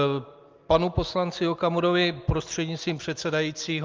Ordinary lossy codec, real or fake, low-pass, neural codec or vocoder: Opus, 24 kbps; real; 7.2 kHz; none